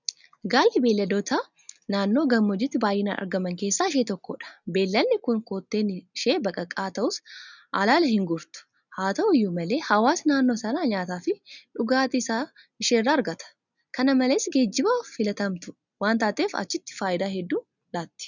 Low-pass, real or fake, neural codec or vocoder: 7.2 kHz; real; none